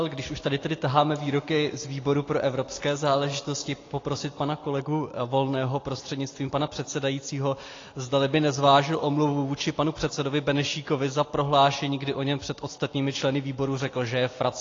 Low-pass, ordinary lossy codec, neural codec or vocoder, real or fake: 7.2 kHz; AAC, 32 kbps; none; real